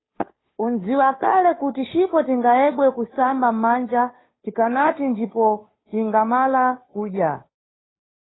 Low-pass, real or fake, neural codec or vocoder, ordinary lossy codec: 7.2 kHz; fake; codec, 16 kHz, 2 kbps, FunCodec, trained on Chinese and English, 25 frames a second; AAC, 16 kbps